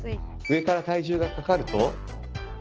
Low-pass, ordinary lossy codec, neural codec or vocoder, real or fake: 7.2 kHz; Opus, 16 kbps; none; real